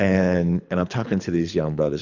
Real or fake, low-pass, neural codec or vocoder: fake; 7.2 kHz; codec, 24 kHz, 3 kbps, HILCodec